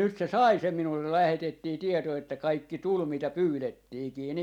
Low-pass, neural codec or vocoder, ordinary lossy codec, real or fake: 19.8 kHz; none; none; real